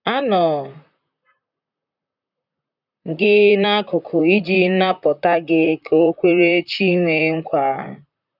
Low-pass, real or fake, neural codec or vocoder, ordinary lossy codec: 5.4 kHz; fake; vocoder, 44.1 kHz, 128 mel bands, Pupu-Vocoder; none